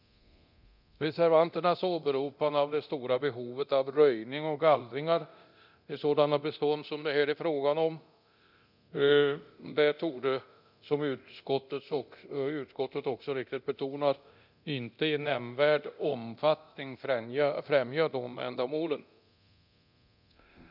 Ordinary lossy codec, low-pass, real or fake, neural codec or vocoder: none; 5.4 kHz; fake; codec, 24 kHz, 0.9 kbps, DualCodec